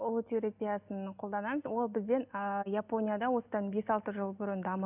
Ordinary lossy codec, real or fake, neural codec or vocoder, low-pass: none; real; none; 3.6 kHz